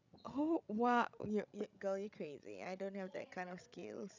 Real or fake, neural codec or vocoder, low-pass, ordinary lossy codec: fake; codec, 16 kHz, 16 kbps, FreqCodec, larger model; 7.2 kHz; none